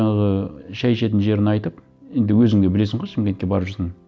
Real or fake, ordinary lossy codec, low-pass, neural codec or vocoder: real; none; none; none